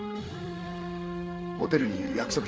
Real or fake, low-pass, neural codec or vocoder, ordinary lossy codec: fake; none; codec, 16 kHz, 8 kbps, FreqCodec, larger model; none